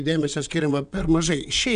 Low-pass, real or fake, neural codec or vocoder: 9.9 kHz; fake; vocoder, 22.05 kHz, 80 mel bands, WaveNeXt